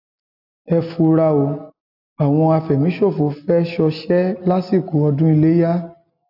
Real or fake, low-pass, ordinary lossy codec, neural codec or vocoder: real; 5.4 kHz; AAC, 48 kbps; none